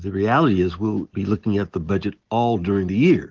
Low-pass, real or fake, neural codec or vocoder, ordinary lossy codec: 7.2 kHz; real; none; Opus, 16 kbps